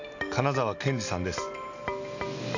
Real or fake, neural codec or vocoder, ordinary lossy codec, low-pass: real; none; AAC, 48 kbps; 7.2 kHz